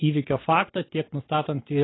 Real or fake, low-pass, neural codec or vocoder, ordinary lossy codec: real; 7.2 kHz; none; AAC, 16 kbps